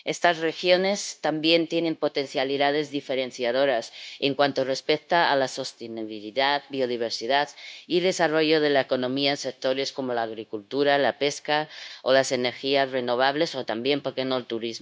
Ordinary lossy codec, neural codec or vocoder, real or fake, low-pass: none; codec, 16 kHz, 0.9 kbps, LongCat-Audio-Codec; fake; none